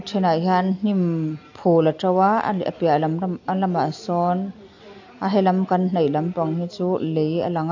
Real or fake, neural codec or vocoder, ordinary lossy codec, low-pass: real; none; AAC, 48 kbps; 7.2 kHz